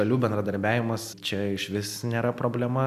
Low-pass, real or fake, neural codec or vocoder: 14.4 kHz; real; none